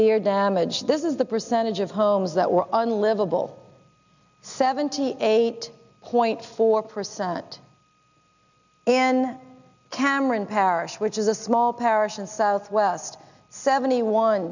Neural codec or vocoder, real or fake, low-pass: none; real; 7.2 kHz